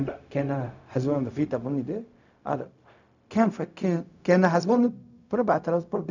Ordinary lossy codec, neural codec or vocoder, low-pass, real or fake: none; codec, 16 kHz, 0.4 kbps, LongCat-Audio-Codec; 7.2 kHz; fake